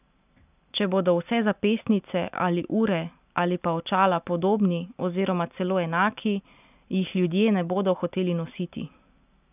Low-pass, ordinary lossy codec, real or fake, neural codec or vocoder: 3.6 kHz; none; real; none